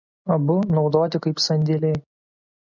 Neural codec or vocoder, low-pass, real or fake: none; 7.2 kHz; real